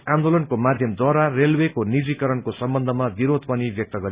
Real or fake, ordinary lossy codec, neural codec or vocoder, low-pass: real; Opus, 64 kbps; none; 3.6 kHz